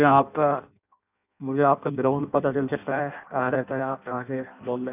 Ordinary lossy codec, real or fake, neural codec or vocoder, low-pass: none; fake; codec, 16 kHz in and 24 kHz out, 0.6 kbps, FireRedTTS-2 codec; 3.6 kHz